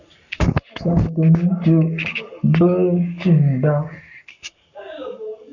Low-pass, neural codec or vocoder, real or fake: 7.2 kHz; codec, 44.1 kHz, 7.8 kbps, Pupu-Codec; fake